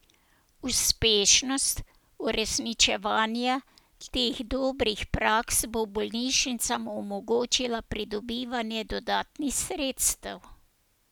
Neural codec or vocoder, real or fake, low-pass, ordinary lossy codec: none; real; none; none